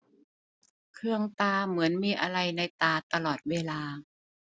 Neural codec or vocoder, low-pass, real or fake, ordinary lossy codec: none; none; real; none